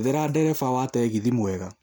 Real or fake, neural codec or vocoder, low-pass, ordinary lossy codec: real; none; none; none